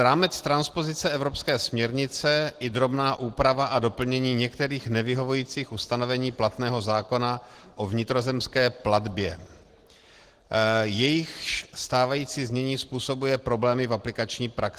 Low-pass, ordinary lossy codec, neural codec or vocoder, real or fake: 14.4 kHz; Opus, 16 kbps; none; real